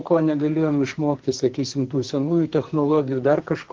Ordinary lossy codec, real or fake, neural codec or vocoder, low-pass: Opus, 16 kbps; fake; codec, 44.1 kHz, 2.6 kbps, SNAC; 7.2 kHz